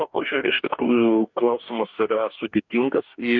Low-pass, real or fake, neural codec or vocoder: 7.2 kHz; fake; codec, 44.1 kHz, 2.6 kbps, DAC